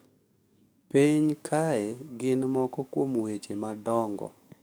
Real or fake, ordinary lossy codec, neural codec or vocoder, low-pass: fake; none; codec, 44.1 kHz, 7.8 kbps, DAC; none